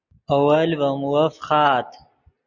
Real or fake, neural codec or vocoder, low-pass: real; none; 7.2 kHz